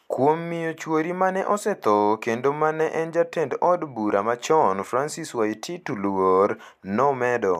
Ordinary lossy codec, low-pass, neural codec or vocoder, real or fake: MP3, 96 kbps; 14.4 kHz; none; real